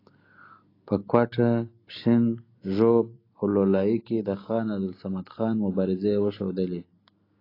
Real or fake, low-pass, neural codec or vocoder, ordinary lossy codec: real; 5.4 kHz; none; AAC, 24 kbps